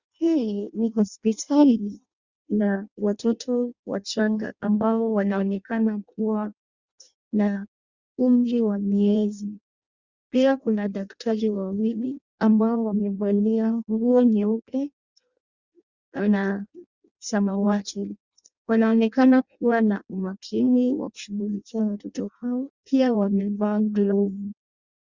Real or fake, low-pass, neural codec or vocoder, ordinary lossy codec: fake; 7.2 kHz; codec, 16 kHz in and 24 kHz out, 0.6 kbps, FireRedTTS-2 codec; Opus, 64 kbps